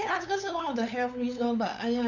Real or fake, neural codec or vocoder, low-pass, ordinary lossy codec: fake; codec, 16 kHz, 8 kbps, FunCodec, trained on LibriTTS, 25 frames a second; 7.2 kHz; none